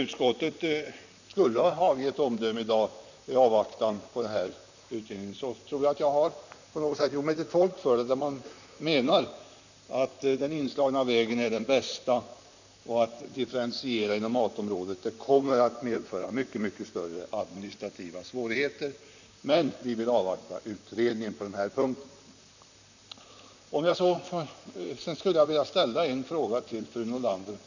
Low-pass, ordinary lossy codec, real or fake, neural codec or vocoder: 7.2 kHz; none; fake; vocoder, 44.1 kHz, 128 mel bands, Pupu-Vocoder